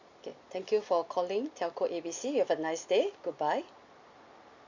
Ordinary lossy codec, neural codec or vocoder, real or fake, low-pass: Opus, 64 kbps; none; real; 7.2 kHz